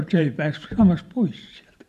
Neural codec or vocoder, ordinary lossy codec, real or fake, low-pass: vocoder, 44.1 kHz, 128 mel bands every 512 samples, BigVGAN v2; MP3, 96 kbps; fake; 14.4 kHz